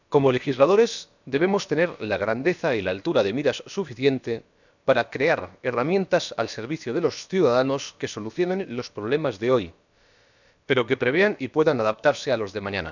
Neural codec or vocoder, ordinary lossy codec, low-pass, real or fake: codec, 16 kHz, about 1 kbps, DyCAST, with the encoder's durations; none; 7.2 kHz; fake